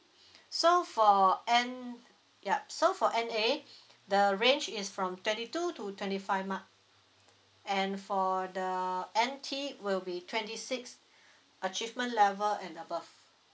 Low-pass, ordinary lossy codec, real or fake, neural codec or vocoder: none; none; real; none